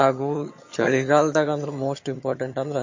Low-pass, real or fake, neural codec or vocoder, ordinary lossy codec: 7.2 kHz; fake; vocoder, 22.05 kHz, 80 mel bands, HiFi-GAN; MP3, 32 kbps